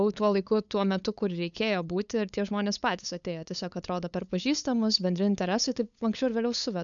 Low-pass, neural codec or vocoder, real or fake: 7.2 kHz; codec, 16 kHz, 8 kbps, FunCodec, trained on LibriTTS, 25 frames a second; fake